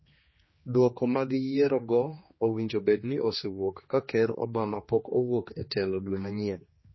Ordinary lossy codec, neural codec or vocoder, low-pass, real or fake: MP3, 24 kbps; codec, 16 kHz, 2 kbps, X-Codec, HuBERT features, trained on general audio; 7.2 kHz; fake